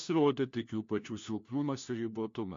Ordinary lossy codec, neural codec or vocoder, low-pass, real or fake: MP3, 48 kbps; codec, 16 kHz, 1 kbps, FunCodec, trained on LibriTTS, 50 frames a second; 7.2 kHz; fake